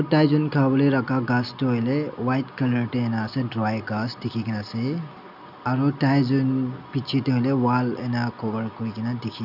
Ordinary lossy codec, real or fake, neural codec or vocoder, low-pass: none; real; none; 5.4 kHz